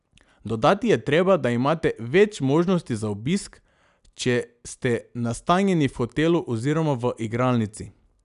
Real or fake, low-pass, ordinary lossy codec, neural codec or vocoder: real; 10.8 kHz; none; none